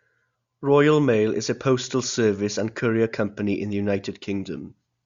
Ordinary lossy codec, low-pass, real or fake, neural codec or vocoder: Opus, 64 kbps; 7.2 kHz; real; none